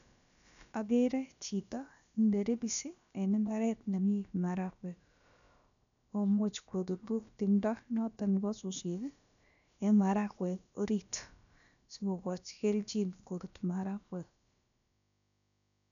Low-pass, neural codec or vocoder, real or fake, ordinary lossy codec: 7.2 kHz; codec, 16 kHz, about 1 kbps, DyCAST, with the encoder's durations; fake; none